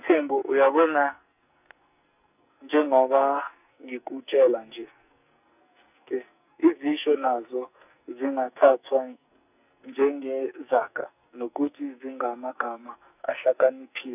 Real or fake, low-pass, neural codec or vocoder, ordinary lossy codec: fake; 3.6 kHz; codec, 44.1 kHz, 2.6 kbps, SNAC; none